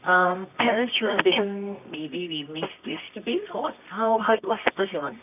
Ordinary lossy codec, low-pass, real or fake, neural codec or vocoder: none; 3.6 kHz; fake; codec, 24 kHz, 0.9 kbps, WavTokenizer, medium music audio release